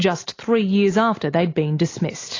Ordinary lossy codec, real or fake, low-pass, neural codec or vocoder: AAC, 32 kbps; real; 7.2 kHz; none